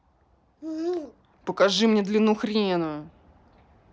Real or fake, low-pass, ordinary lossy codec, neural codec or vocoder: real; none; none; none